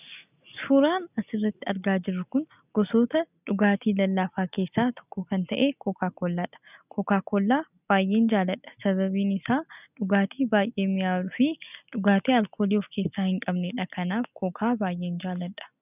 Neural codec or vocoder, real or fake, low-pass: none; real; 3.6 kHz